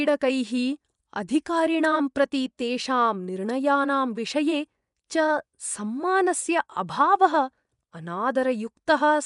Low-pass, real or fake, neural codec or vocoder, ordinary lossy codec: 10.8 kHz; fake; vocoder, 24 kHz, 100 mel bands, Vocos; none